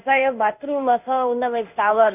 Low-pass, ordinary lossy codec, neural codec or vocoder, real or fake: 3.6 kHz; none; codec, 16 kHz in and 24 kHz out, 1 kbps, XY-Tokenizer; fake